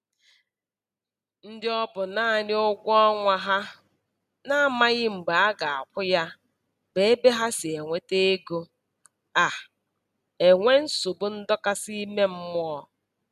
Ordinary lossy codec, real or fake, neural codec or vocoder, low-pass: none; real; none; 14.4 kHz